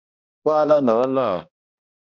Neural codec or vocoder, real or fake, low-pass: codec, 16 kHz, 1 kbps, X-Codec, HuBERT features, trained on balanced general audio; fake; 7.2 kHz